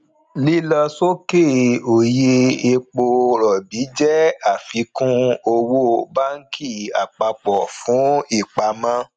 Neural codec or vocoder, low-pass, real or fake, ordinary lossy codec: none; 9.9 kHz; real; none